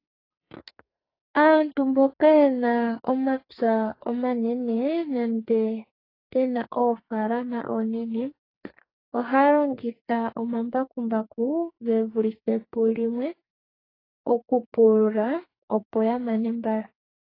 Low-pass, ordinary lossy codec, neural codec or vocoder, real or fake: 5.4 kHz; AAC, 24 kbps; codec, 44.1 kHz, 2.6 kbps, SNAC; fake